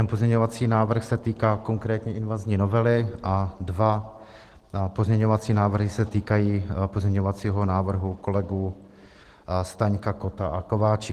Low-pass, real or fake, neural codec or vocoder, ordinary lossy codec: 14.4 kHz; real; none; Opus, 16 kbps